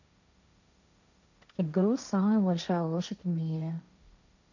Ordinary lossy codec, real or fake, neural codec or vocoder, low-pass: none; fake; codec, 16 kHz, 1.1 kbps, Voila-Tokenizer; 7.2 kHz